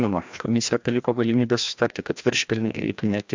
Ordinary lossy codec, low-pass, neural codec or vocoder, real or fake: MP3, 64 kbps; 7.2 kHz; codec, 16 kHz, 1 kbps, FreqCodec, larger model; fake